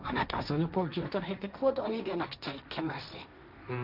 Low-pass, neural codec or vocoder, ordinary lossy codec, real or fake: 5.4 kHz; codec, 16 kHz, 1.1 kbps, Voila-Tokenizer; none; fake